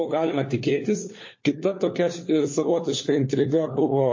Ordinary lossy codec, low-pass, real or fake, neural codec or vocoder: MP3, 32 kbps; 7.2 kHz; fake; codec, 16 kHz, 4 kbps, FunCodec, trained on LibriTTS, 50 frames a second